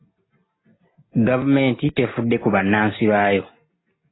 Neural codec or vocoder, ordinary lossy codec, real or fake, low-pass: none; AAC, 16 kbps; real; 7.2 kHz